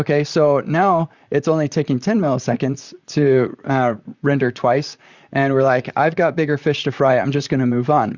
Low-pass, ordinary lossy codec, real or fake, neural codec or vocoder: 7.2 kHz; Opus, 64 kbps; fake; vocoder, 44.1 kHz, 128 mel bands, Pupu-Vocoder